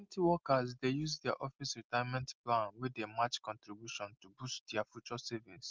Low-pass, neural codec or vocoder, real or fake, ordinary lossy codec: 7.2 kHz; none; real; Opus, 24 kbps